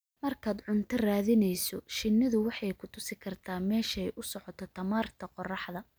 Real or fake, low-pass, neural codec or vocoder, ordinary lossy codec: real; none; none; none